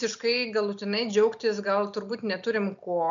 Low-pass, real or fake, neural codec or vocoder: 7.2 kHz; real; none